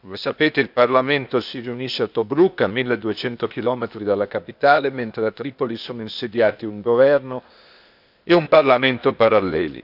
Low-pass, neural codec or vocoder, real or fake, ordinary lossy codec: 5.4 kHz; codec, 16 kHz, 0.8 kbps, ZipCodec; fake; none